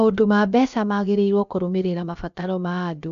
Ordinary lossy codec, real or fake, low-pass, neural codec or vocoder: AAC, 96 kbps; fake; 7.2 kHz; codec, 16 kHz, about 1 kbps, DyCAST, with the encoder's durations